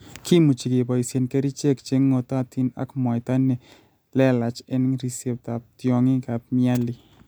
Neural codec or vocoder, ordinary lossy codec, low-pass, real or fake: none; none; none; real